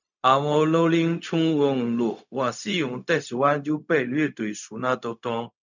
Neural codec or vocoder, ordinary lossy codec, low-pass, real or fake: codec, 16 kHz, 0.4 kbps, LongCat-Audio-Codec; none; 7.2 kHz; fake